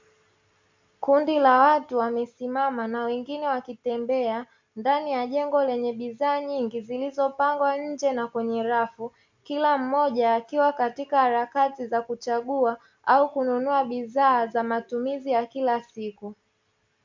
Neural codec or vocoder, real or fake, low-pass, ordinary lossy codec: none; real; 7.2 kHz; MP3, 64 kbps